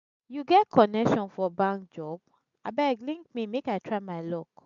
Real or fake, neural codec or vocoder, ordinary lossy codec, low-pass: real; none; MP3, 64 kbps; 7.2 kHz